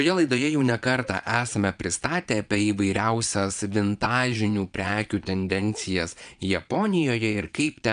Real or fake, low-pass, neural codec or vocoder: fake; 9.9 kHz; vocoder, 22.05 kHz, 80 mel bands, Vocos